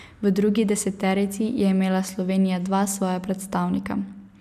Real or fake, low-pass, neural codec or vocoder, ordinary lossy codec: real; 14.4 kHz; none; none